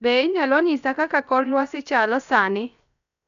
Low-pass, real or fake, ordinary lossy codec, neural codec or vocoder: 7.2 kHz; fake; none; codec, 16 kHz, 0.7 kbps, FocalCodec